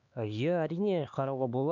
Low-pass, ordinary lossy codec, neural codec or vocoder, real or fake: 7.2 kHz; none; codec, 16 kHz, 2 kbps, X-Codec, HuBERT features, trained on LibriSpeech; fake